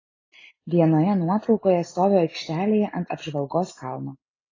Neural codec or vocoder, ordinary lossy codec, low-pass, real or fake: none; AAC, 32 kbps; 7.2 kHz; real